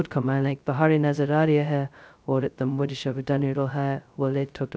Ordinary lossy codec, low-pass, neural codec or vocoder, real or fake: none; none; codec, 16 kHz, 0.2 kbps, FocalCodec; fake